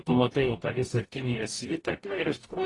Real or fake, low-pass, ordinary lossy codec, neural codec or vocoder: fake; 10.8 kHz; AAC, 32 kbps; codec, 44.1 kHz, 0.9 kbps, DAC